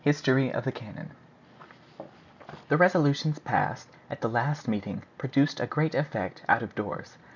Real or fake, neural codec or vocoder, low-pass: real; none; 7.2 kHz